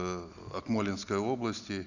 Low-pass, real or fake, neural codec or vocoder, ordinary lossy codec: 7.2 kHz; real; none; none